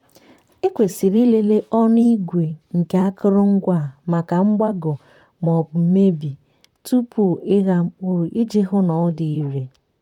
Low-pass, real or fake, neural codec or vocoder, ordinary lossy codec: 19.8 kHz; fake; vocoder, 44.1 kHz, 128 mel bands, Pupu-Vocoder; none